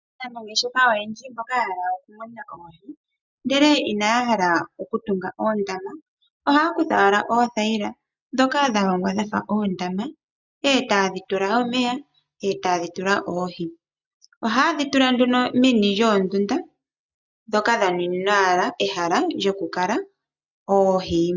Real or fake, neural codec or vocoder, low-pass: real; none; 7.2 kHz